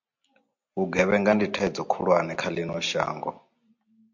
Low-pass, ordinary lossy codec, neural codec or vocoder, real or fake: 7.2 kHz; MP3, 48 kbps; none; real